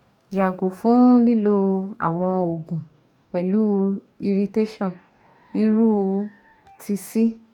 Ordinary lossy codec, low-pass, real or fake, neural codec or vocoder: none; 19.8 kHz; fake; codec, 44.1 kHz, 2.6 kbps, DAC